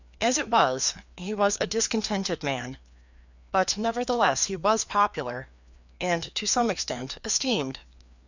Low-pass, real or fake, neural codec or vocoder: 7.2 kHz; fake; codec, 16 kHz, 2 kbps, FreqCodec, larger model